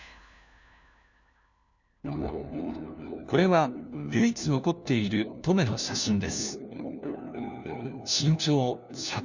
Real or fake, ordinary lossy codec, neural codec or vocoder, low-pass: fake; none; codec, 16 kHz, 1 kbps, FunCodec, trained on LibriTTS, 50 frames a second; 7.2 kHz